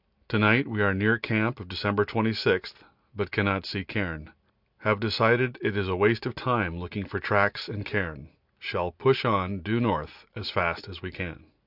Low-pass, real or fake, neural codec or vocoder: 5.4 kHz; real; none